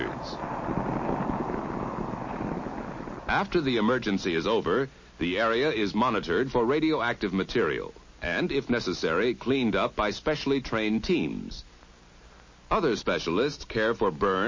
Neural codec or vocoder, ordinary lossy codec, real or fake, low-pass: none; MP3, 32 kbps; real; 7.2 kHz